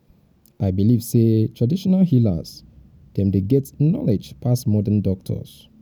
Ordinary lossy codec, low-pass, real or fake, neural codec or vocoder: none; 19.8 kHz; real; none